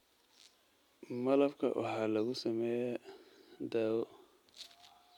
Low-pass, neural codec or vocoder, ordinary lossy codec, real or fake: 19.8 kHz; none; MP3, 96 kbps; real